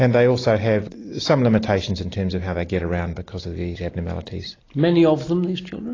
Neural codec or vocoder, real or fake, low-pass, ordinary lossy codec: none; real; 7.2 kHz; AAC, 32 kbps